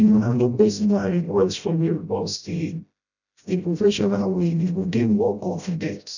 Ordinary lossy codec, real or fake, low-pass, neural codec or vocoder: none; fake; 7.2 kHz; codec, 16 kHz, 0.5 kbps, FreqCodec, smaller model